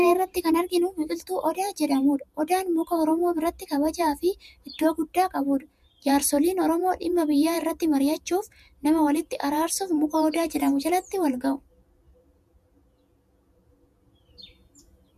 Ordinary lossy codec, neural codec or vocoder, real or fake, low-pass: MP3, 96 kbps; vocoder, 48 kHz, 128 mel bands, Vocos; fake; 14.4 kHz